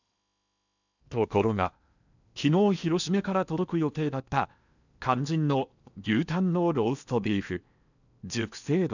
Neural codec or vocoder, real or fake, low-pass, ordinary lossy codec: codec, 16 kHz in and 24 kHz out, 0.8 kbps, FocalCodec, streaming, 65536 codes; fake; 7.2 kHz; none